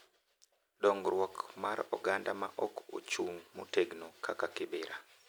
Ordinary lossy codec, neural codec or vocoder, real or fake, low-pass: none; none; real; none